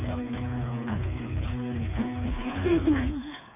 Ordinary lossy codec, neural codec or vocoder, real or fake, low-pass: none; codec, 16 kHz, 4 kbps, FreqCodec, smaller model; fake; 3.6 kHz